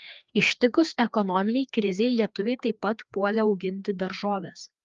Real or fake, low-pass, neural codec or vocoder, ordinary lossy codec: fake; 7.2 kHz; codec, 16 kHz, 2 kbps, FreqCodec, larger model; Opus, 32 kbps